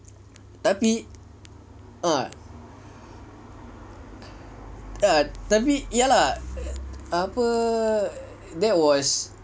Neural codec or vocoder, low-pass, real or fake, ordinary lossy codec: none; none; real; none